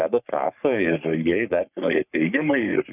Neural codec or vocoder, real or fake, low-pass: codec, 44.1 kHz, 3.4 kbps, Pupu-Codec; fake; 3.6 kHz